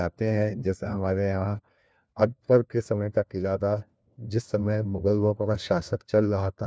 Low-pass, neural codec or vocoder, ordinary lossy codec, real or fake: none; codec, 16 kHz, 1 kbps, FunCodec, trained on LibriTTS, 50 frames a second; none; fake